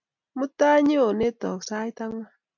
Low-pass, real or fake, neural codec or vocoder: 7.2 kHz; real; none